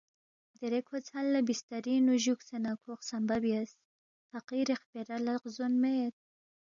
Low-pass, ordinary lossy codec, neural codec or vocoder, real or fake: 7.2 kHz; MP3, 96 kbps; none; real